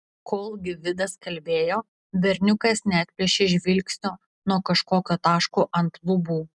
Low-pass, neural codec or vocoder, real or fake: 10.8 kHz; none; real